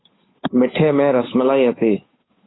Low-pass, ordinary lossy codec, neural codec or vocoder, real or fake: 7.2 kHz; AAC, 16 kbps; codec, 16 kHz, 8 kbps, FunCodec, trained on Chinese and English, 25 frames a second; fake